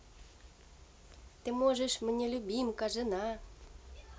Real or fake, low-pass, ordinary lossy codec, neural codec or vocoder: real; none; none; none